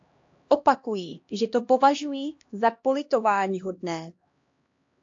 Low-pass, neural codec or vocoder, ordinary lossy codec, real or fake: 7.2 kHz; codec, 16 kHz, 1 kbps, X-Codec, HuBERT features, trained on LibriSpeech; AAC, 48 kbps; fake